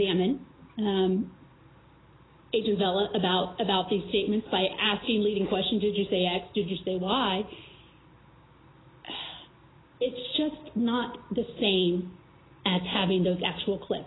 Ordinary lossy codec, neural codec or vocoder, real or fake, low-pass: AAC, 16 kbps; none; real; 7.2 kHz